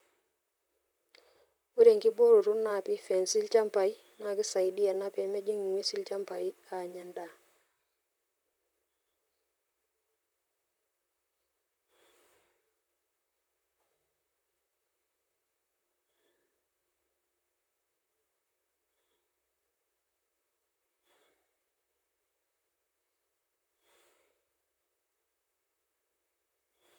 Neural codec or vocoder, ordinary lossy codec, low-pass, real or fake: vocoder, 44.1 kHz, 128 mel bands, Pupu-Vocoder; none; none; fake